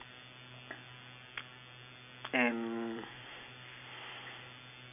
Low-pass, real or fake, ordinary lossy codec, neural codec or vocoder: 3.6 kHz; real; none; none